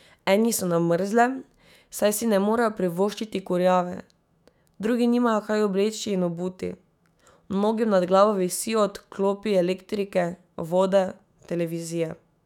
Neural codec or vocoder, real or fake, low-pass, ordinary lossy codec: autoencoder, 48 kHz, 128 numbers a frame, DAC-VAE, trained on Japanese speech; fake; 19.8 kHz; none